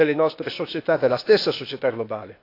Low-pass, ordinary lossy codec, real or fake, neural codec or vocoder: 5.4 kHz; AAC, 32 kbps; fake; codec, 16 kHz, 0.8 kbps, ZipCodec